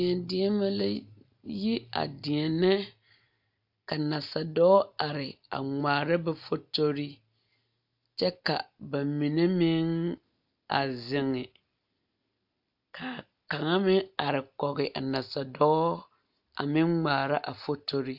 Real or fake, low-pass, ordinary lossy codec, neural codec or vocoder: real; 5.4 kHz; AAC, 48 kbps; none